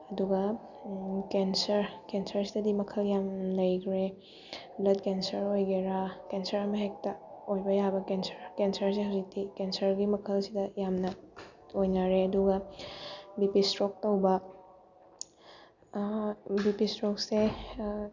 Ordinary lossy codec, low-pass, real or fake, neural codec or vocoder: none; 7.2 kHz; real; none